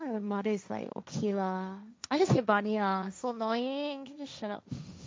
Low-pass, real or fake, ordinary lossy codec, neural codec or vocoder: none; fake; none; codec, 16 kHz, 1.1 kbps, Voila-Tokenizer